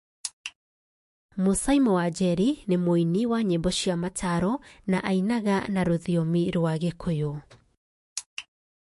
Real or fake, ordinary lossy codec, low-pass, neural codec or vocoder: fake; MP3, 48 kbps; 14.4 kHz; autoencoder, 48 kHz, 128 numbers a frame, DAC-VAE, trained on Japanese speech